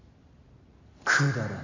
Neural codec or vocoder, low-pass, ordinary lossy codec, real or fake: none; 7.2 kHz; AAC, 32 kbps; real